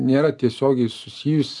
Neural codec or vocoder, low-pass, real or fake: none; 10.8 kHz; real